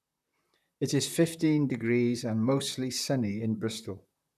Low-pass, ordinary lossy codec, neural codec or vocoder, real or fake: 14.4 kHz; none; vocoder, 44.1 kHz, 128 mel bands, Pupu-Vocoder; fake